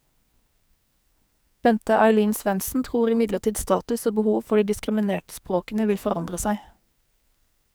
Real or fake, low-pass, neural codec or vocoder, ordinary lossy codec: fake; none; codec, 44.1 kHz, 2.6 kbps, SNAC; none